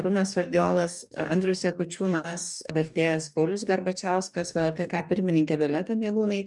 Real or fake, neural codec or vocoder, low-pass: fake; codec, 44.1 kHz, 2.6 kbps, DAC; 10.8 kHz